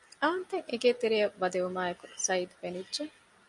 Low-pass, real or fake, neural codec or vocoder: 10.8 kHz; real; none